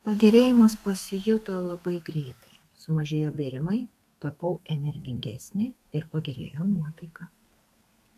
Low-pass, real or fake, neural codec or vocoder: 14.4 kHz; fake; codec, 32 kHz, 1.9 kbps, SNAC